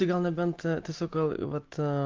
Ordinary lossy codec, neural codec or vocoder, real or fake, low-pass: Opus, 32 kbps; none; real; 7.2 kHz